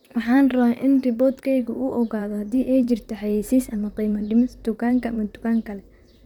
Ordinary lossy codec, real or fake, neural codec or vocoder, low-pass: none; fake; vocoder, 44.1 kHz, 128 mel bands, Pupu-Vocoder; 19.8 kHz